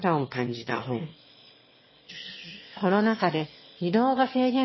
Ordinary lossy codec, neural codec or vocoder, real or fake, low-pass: MP3, 24 kbps; autoencoder, 22.05 kHz, a latent of 192 numbers a frame, VITS, trained on one speaker; fake; 7.2 kHz